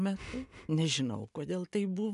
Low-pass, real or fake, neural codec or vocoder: 10.8 kHz; real; none